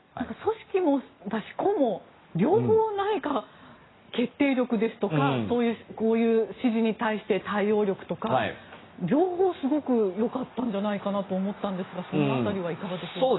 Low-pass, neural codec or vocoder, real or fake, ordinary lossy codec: 7.2 kHz; none; real; AAC, 16 kbps